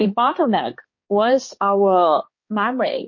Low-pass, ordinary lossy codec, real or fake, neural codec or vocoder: 7.2 kHz; MP3, 32 kbps; fake; codec, 16 kHz, 2 kbps, X-Codec, HuBERT features, trained on balanced general audio